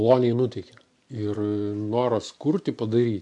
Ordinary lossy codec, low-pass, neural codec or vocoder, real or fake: MP3, 64 kbps; 10.8 kHz; none; real